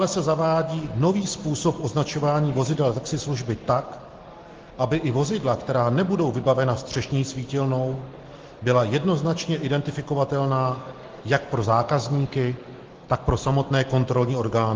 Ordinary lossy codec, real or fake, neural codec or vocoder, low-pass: Opus, 16 kbps; real; none; 7.2 kHz